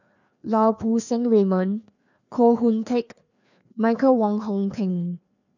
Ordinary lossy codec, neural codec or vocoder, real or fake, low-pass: none; codec, 16 kHz, 2 kbps, FreqCodec, larger model; fake; 7.2 kHz